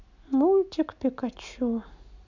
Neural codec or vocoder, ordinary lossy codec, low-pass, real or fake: none; none; 7.2 kHz; real